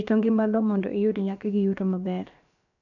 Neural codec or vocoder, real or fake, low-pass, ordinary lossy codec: codec, 16 kHz, about 1 kbps, DyCAST, with the encoder's durations; fake; 7.2 kHz; none